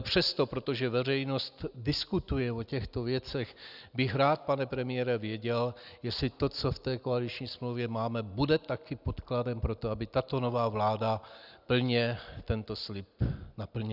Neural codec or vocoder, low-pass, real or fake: none; 5.4 kHz; real